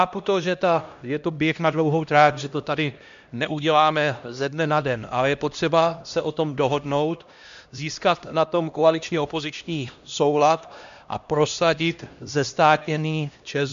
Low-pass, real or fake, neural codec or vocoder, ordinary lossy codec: 7.2 kHz; fake; codec, 16 kHz, 1 kbps, X-Codec, HuBERT features, trained on LibriSpeech; MP3, 64 kbps